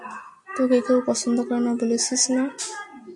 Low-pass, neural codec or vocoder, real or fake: 10.8 kHz; none; real